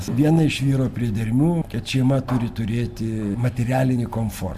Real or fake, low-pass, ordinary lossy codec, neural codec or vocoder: real; 14.4 kHz; AAC, 96 kbps; none